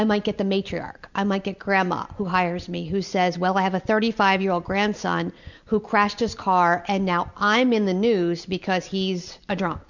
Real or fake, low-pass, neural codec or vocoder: real; 7.2 kHz; none